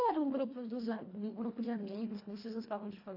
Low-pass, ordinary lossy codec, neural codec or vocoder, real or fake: 5.4 kHz; AAC, 32 kbps; codec, 24 kHz, 1.5 kbps, HILCodec; fake